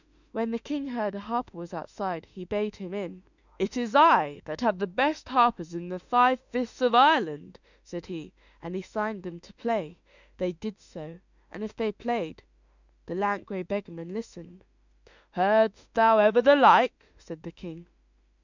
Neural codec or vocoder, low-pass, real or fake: autoencoder, 48 kHz, 32 numbers a frame, DAC-VAE, trained on Japanese speech; 7.2 kHz; fake